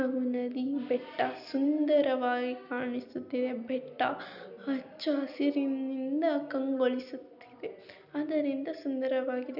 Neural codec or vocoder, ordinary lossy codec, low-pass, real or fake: none; none; 5.4 kHz; real